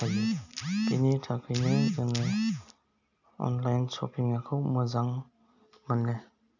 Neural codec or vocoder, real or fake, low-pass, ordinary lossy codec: none; real; 7.2 kHz; none